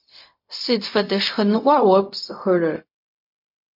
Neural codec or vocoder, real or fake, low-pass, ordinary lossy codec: codec, 16 kHz, 0.4 kbps, LongCat-Audio-Codec; fake; 5.4 kHz; MP3, 32 kbps